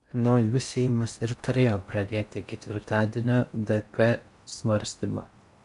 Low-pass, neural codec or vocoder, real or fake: 10.8 kHz; codec, 16 kHz in and 24 kHz out, 0.6 kbps, FocalCodec, streaming, 4096 codes; fake